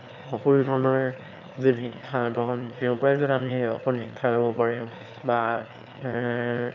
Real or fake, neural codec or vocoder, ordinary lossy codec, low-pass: fake; autoencoder, 22.05 kHz, a latent of 192 numbers a frame, VITS, trained on one speaker; none; 7.2 kHz